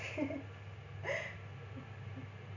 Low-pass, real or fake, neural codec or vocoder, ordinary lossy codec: 7.2 kHz; real; none; none